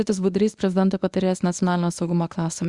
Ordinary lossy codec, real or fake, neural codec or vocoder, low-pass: Opus, 64 kbps; fake; codec, 24 kHz, 0.9 kbps, WavTokenizer, medium speech release version 1; 10.8 kHz